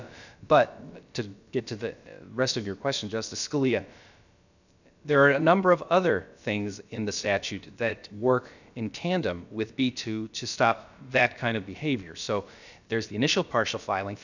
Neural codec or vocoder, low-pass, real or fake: codec, 16 kHz, about 1 kbps, DyCAST, with the encoder's durations; 7.2 kHz; fake